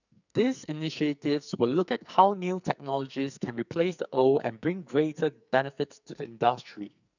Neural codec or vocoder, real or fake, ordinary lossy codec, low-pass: codec, 44.1 kHz, 2.6 kbps, SNAC; fake; none; 7.2 kHz